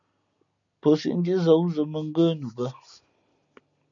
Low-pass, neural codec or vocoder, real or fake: 7.2 kHz; none; real